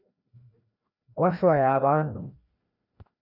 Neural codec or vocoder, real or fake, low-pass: codec, 16 kHz, 1 kbps, FreqCodec, larger model; fake; 5.4 kHz